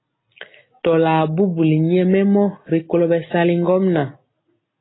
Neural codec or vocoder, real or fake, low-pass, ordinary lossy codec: none; real; 7.2 kHz; AAC, 16 kbps